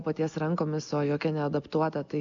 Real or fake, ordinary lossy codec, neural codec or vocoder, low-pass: real; MP3, 64 kbps; none; 7.2 kHz